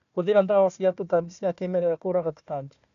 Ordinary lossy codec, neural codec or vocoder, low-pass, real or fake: none; codec, 16 kHz, 1 kbps, FunCodec, trained on Chinese and English, 50 frames a second; 7.2 kHz; fake